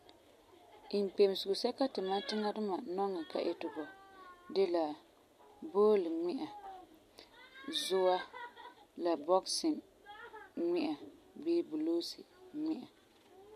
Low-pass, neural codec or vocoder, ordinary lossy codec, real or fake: 14.4 kHz; none; MP3, 64 kbps; real